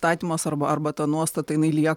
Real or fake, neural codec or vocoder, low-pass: fake; vocoder, 44.1 kHz, 128 mel bands every 512 samples, BigVGAN v2; 19.8 kHz